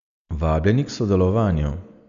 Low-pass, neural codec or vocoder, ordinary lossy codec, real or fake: 7.2 kHz; none; none; real